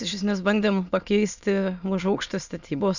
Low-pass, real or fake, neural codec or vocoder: 7.2 kHz; fake; autoencoder, 22.05 kHz, a latent of 192 numbers a frame, VITS, trained on many speakers